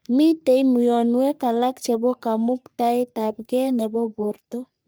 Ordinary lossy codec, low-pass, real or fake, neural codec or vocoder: none; none; fake; codec, 44.1 kHz, 3.4 kbps, Pupu-Codec